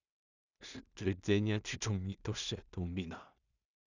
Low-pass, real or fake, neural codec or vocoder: 7.2 kHz; fake; codec, 16 kHz in and 24 kHz out, 0.4 kbps, LongCat-Audio-Codec, two codebook decoder